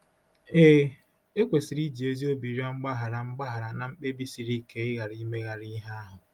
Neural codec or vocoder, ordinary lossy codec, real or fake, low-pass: none; Opus, 32 kbps; real; 19.8 kHz